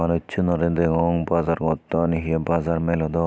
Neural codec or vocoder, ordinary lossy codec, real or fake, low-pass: none; none; real; none